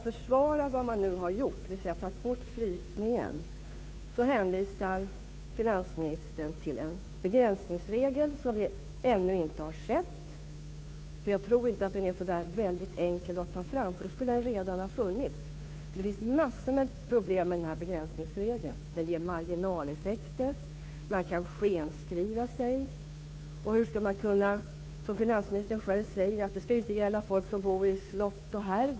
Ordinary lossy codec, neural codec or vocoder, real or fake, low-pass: none; codec, 16 kHz, 2 kbps, FunCodec, trained on Chinese and English, 25 frames a second; fake; none